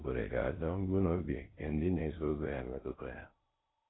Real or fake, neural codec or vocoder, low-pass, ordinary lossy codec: fake; codec, 16 kHz in and 24 kHz out, 0.6 kbps, FocalCodec, streaming, 4096 codes; 7.2 kHz; AAC, 16 kbps